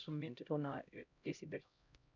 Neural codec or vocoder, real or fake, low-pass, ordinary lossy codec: codec, 16 kHz, 0.5 kbps, X-Codec, HuBERT features, trained on LibriSpeech; fake; 7.2 kHz; none